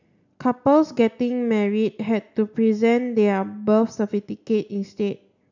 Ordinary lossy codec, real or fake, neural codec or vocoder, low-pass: none; real; none; 7.2 kHz